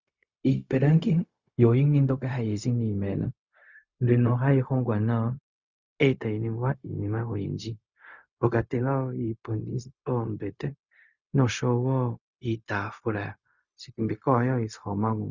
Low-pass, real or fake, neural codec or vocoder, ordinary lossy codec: 7.2 kHz; fake; codec, 16 kHz, 0.4 kbps, LongCat-Audio-Codec; Opus, 64 kbps